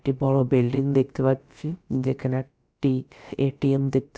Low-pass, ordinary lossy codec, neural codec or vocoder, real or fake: none; none; codec, 16 kHz, about 1 kbps, DyCAST, with the encoder's durations; fake